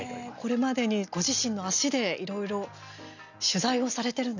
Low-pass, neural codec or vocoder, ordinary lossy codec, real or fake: 7.2 kHz; none; none; real